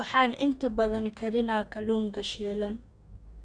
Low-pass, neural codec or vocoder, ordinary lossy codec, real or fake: 9.9 kHz; codec, 44.1 kHz, 2.6 kbps, DAC; none; fake